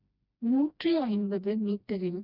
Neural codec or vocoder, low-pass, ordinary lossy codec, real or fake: codec, 16 kHz, 1 kbps, FreqCodec, smaller model; 5.4 kHz; none; fake